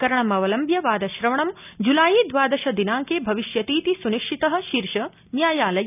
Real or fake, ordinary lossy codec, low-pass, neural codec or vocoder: real; none; 3.6 kHz; none